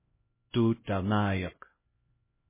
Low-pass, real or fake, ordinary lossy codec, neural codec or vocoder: 3.6 kHz; fake; MP3, 16 kbps; codec, 16 kHz, 0.5 kbps, X-Codec, HuBERT features, trained on LibriSpeech